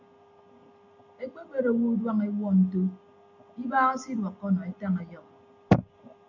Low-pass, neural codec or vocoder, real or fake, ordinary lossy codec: 7.2 kHz; none; real; AAC, 48 kbps